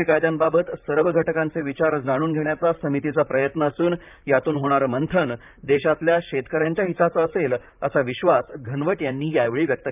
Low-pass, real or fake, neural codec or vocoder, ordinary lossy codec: 3.6 kHz; fake; vocoder, 44.1 kHz, 128 mel bands, Pupu-Vocoder; none